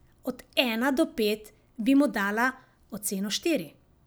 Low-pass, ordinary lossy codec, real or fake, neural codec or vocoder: none; none; real; none